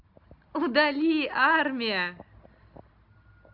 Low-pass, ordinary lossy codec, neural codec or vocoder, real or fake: 5.4 kHz; AAC, 48 kbps; none; real